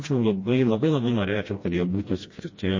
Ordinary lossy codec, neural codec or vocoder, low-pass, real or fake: MP3, 32 kbps; codec, 16 kHz, 1 kbps, FreqCodec, smaller model; 7.2 kHz; fake